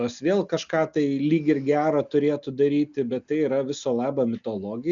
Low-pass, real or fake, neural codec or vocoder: 7.2 kHz; real; none